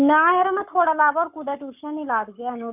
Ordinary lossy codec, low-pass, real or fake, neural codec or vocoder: none; 3.6 kHz; fake; codec, 44.1 kHz, 7.8 kbps, Pupu-Codec